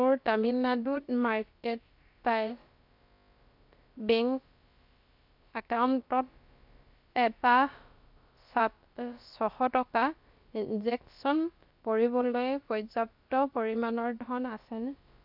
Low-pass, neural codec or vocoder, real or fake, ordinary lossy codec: 5.4 kHz; codec, 16 kHz, about 1 kbps, DyCAST, with the encoder's durations; fake; MP3, 48 kbps